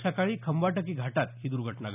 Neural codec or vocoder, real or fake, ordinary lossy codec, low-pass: none; real; none; 3.6 kHz